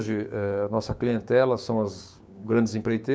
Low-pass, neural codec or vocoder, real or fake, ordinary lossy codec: none; codec, 16 kHz, 6 kbps, DAC; fake; none